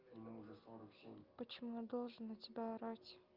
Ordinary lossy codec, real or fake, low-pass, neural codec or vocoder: Opus, 24 kbps; real; 5.4 kHz; none